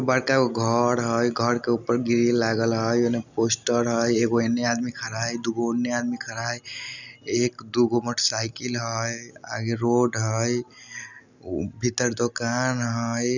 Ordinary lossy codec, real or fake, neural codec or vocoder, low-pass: none; real; none; 7.2 kHz